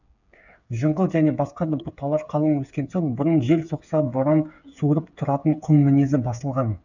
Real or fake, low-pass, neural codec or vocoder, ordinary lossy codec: fake; 7.2 kHz; codec, 16 kHz, 8 kbps, FreqCodec, smaller model; none